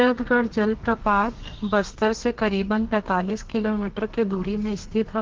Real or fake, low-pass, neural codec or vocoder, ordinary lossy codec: fake; 7.2 kHz; codec, 32 kHz, 1.9 kbps, SNAC; Opus, 16 kbps